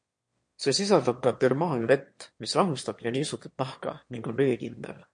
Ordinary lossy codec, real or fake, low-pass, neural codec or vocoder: MP3, 48 kbps; fake; 9.9 kHz; autoencoder, 22.05 kHz, a latent of 192 numbers a frame, VITS, trained on one speaker